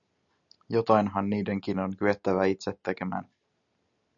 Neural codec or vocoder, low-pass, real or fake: none; 7.2 kHz; real